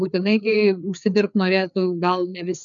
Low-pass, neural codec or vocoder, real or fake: 7.2 kHz; codec, 16 kHz, 4 kbps, FreqCodec, larger model; fake